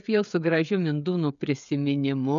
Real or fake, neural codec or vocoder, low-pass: fake; codec, 16 kHz, 16 kbps, FreqCodec, smaller model; 7.2 kHz